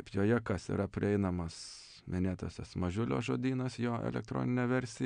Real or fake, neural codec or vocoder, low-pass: real; none; 9.9 kHz